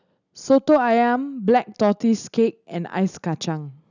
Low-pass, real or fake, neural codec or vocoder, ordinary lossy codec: 7.2 kHz; real; none; none